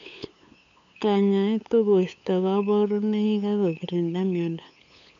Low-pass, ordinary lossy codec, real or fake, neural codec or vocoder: 7.2 kHz; MP3, 64 kbps; fake; codec, 16 kHz, 8 kbps, FunCodec, trained on LibriTTS, 25 frames a second